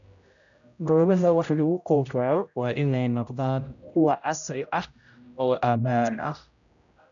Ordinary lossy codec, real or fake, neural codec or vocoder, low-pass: none; fake; codec, 16 kHz, 0.5 kbps, X-Codec, HuBERT features, trained on general audio; 7.2 kHz